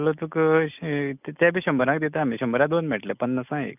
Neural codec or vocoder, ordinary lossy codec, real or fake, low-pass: none; none; real; 3.6 kHz